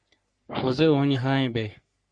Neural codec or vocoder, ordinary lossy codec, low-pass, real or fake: codec, 44.1 kHz, 3.4 kbps, Pupu-Codec; Opus, 64 kbps; 9.9 kHz; fake